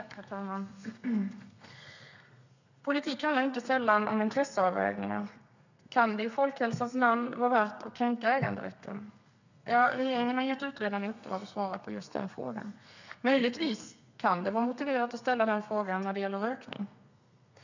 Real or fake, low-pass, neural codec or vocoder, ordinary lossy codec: fake; 7.2 kHz; codec, 32 kHz, 1.9 kbps, SNAC; none